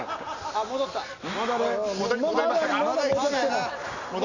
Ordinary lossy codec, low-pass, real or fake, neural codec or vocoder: none; 7.2 kHz; real; none